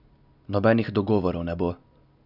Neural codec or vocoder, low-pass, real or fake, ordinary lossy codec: none; 5.4 kHz; real; none